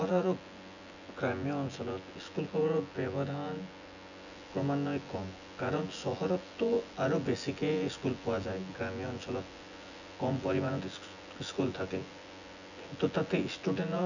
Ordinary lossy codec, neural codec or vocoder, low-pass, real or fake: none; vocoder, 24 kHz, 100 mel bands, Vocos; 7.2 kHz; fake